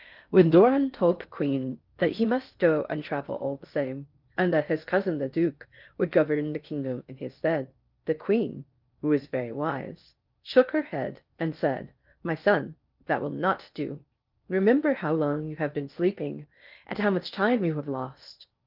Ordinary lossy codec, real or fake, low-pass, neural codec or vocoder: Opus, 24 kbps; fake; 5.4 kHz; codec, 16 kHz in and 24 kHz out, 0.6 kbps, FocalCodec, streaming, 4096 codes